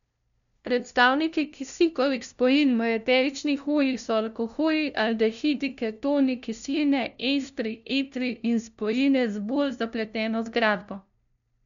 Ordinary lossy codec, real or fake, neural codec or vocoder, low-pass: none; fake; codec, 16 kHz, 0.5 kbps, FunCodec, trained on LibriTTS, 25 frames a second; 7.2 kHz